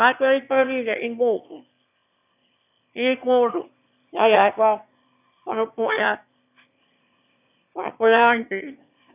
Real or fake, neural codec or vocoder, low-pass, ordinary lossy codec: fake; autoencoder, 22.05 kHz, a latent of 192 numbers a frame, VITS, trained on one speaker; 3.6 kHz; none